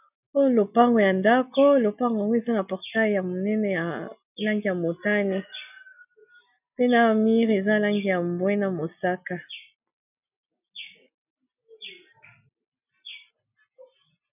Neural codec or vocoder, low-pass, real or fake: none; 3.6 kHz; real